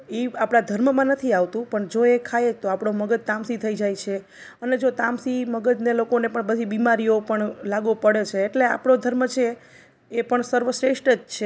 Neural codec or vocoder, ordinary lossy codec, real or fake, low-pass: none; none; real; none